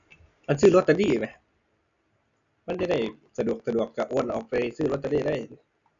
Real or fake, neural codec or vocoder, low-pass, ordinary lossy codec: real; none; 7.2 kHz; none